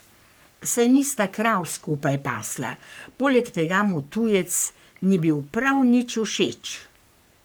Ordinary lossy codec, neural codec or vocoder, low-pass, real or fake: none; codec, 44.1 kHz, 3.4 kbps, Pupu-Codec; none; fake